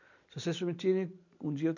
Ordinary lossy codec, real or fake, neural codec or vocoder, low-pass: none; real; none; 7.2 kHz